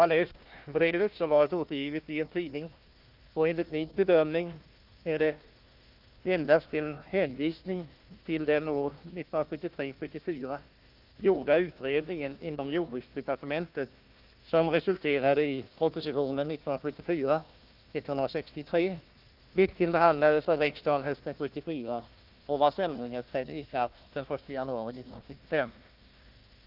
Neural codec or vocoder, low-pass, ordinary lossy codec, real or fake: codec, 16 kHz, 1 kbps, FunCodec, trained on Chinese and English, 50 frames a second; 5.4 kHz; Opus, 32 kbps; fake